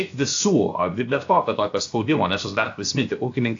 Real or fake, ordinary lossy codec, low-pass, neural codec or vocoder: fake; AAC, 48 kbps; 7.2 kHz; codec, 16 kHz, 0.7 kbps, FocalCodec